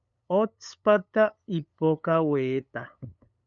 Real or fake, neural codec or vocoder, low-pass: fake; codec, 16 kHz, 8 kbps, FunCodec, trained on LibriTTS, 25 frames a second; 7.2 kHz